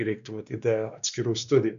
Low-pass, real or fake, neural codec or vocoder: 7.2 kHz; fake; codec, 16 kHz, 2 kbps, X-Codec, WavLM features, trained on Multilingual LibriSpeech